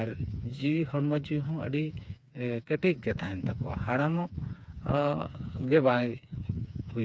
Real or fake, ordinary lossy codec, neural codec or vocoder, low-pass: fake; none; codec, 16 kHz, 4 kbps, FreqCodec, smaller model; none